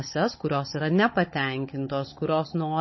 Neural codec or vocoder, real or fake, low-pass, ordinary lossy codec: codec, 16 kHz, 16 kbps, FunCodec, trained on Chinese and English, 50 frames a second; fake; 7.2 kHz; MP3, 24 kbps